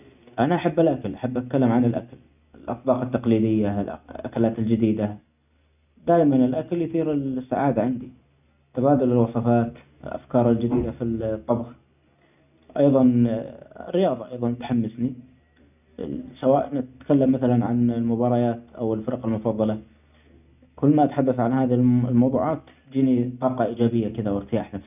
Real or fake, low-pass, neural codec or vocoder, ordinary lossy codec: real; 3.6 kHz; none; none